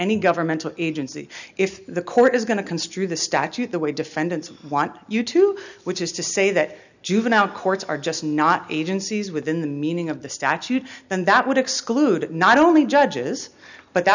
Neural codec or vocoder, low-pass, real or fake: none; 7.2 kHz; real